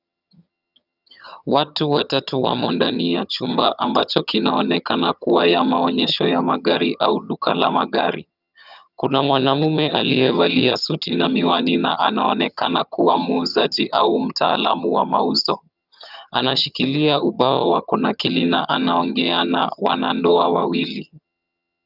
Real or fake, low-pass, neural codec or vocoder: fake; 5.4 kHz; vocoder, 22.05 kHz, 80 mel bands, HiFi-GAN